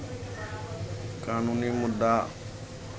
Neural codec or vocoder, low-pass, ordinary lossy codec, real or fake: none; none; none; real